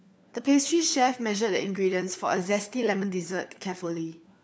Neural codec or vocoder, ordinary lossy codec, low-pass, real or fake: codec, 16 kHz, 4 kbps, FunCodec, trained on LibriTTS, 50 frames a second; none; none; fake